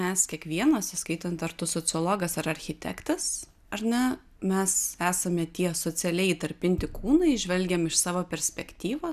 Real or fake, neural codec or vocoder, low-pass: real; none; 14.4 kHz